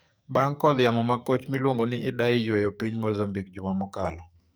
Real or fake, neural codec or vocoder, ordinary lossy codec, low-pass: fake; codec, 44.1 kHz, 2.6 kbps, SNAC; none; none